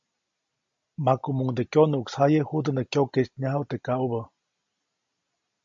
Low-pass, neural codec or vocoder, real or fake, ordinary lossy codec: 7.2 kHz; none; real; MP3, 48 kbps